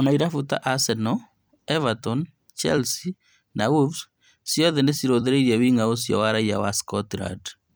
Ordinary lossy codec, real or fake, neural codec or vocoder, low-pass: none; fake; vocoder, 44.1 kHz, 128 mel bands every 256 samples, BigVGAN v2; none